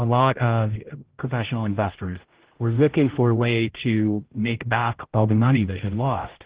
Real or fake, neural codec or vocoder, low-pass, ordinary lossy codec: fake; codec, 16 kHz, 0.5 kbps, X-Codec, HuBERT features, trained on general audio; 3.6 kHz; Opus, 16 kbps